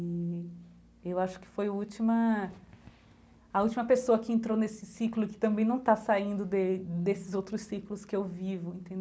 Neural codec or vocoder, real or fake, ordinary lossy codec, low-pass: none; real; none; none